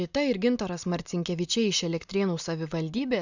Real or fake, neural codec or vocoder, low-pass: real; none; 7.2 kHz